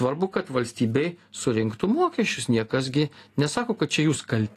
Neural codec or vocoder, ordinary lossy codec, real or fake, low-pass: none; AAC, 48 kbps; real; 14.4 kHz